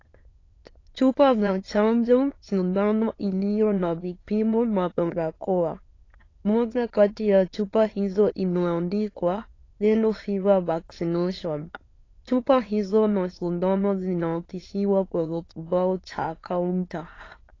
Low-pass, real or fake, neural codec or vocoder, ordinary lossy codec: 7.2 kHz; fake; autoencoder, 22.05 kHz, a latent of 192 numbers a frame, VITS, trained on many speakers; AAC, 32 kbps